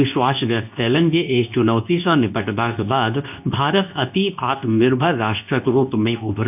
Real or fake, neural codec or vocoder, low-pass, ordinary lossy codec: fake; codec, 24 kHz, 0.9 kbps, WavTokenizer, medium speech release version 2; 3.6 kHz; none